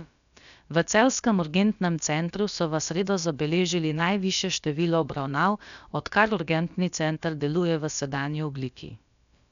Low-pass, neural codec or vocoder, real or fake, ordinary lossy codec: 7.2 kHz; codec, 16 kHz, about 1 kbps, DyCAST, with the encoder's durations; fake; none